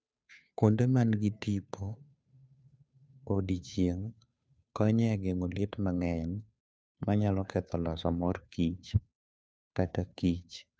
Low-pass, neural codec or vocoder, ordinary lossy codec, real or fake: none; codec, 16 kHz, 2 kbps, FunCodec, trained on Chinese and English, 25 frames a second; none; fake